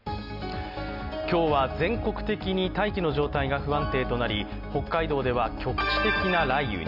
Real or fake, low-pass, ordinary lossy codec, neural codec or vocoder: real; 5.4 kHz; none; none